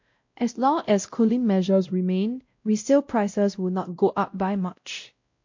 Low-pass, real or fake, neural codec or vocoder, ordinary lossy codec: 7.2 kHz; fake; codec, 16 kHz, 0.5 kbps, X-Codec, WavLM features, trained on Multilingual LibriSpeech; MP3, 48 kbps